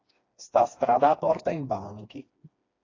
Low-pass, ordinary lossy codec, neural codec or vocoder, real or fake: 7.2 kHz; AAC, 32 kbps; codec, 16 kHz, 2 kbps, FreqCodec, smaller model; fake